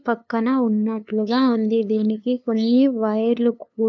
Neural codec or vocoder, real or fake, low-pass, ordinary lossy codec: codec, 16 kHz, 2 kbps, FunCodec, trained on LibriTTS, 25 frames a second; fake; 7.2 kHz; none